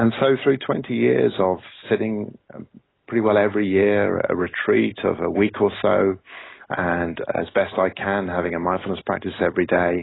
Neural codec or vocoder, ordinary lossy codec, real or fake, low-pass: none; AAC, 16 kbps; real; 7.2 kHz